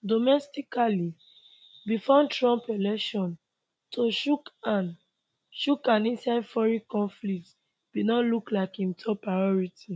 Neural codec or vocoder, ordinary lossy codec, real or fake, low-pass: none; none; real; none